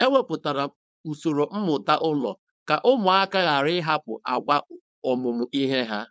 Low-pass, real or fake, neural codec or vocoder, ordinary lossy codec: none; fake; codec, 16 kHz, 4.8 kbps, FACodec; none